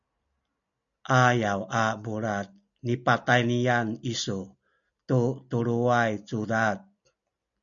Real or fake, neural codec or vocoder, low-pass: real; none; 7.2 kHz